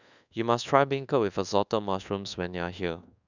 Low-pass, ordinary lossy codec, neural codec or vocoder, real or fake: 7.2 kHz; none; codec, 24 kHz, 1.2 kbps, DualCodec; fake